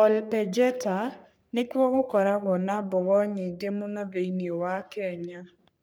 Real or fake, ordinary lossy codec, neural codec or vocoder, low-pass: fake; none; codec, 44.1 kHz, 3.4 kbps, Pupu-Codec; none